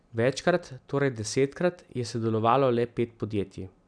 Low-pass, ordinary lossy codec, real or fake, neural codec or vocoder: 9.9 kHz; none; real; none